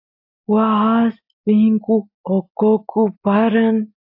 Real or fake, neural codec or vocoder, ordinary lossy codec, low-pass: real; none; AAC, 48 kbps; 5.4 kHz